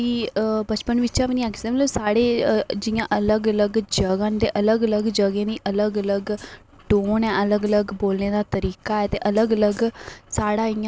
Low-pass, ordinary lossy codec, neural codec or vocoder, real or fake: none; none; none; real